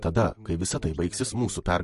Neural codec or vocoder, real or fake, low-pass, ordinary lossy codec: none; real; 14.4 kHz; MP3, 48 kbps